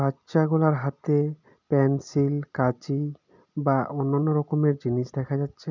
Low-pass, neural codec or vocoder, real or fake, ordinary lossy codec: 7.2 kHz; none; real; none